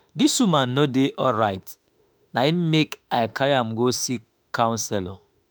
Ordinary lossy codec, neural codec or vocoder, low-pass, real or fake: none; autoencoder, 48 kHz, 32 numbers a frame, DAC-VAE, trained on Japanese speech; none; fake